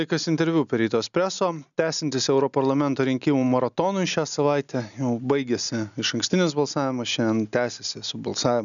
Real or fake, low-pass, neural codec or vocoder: real; 7.2 kHz; none